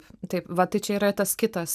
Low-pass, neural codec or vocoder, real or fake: 14.4 kHz; none; real